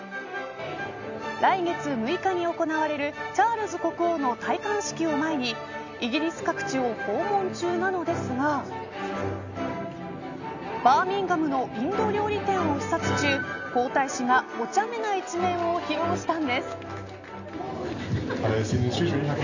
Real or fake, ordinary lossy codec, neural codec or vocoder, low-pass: real; none; none; 7.2 kHz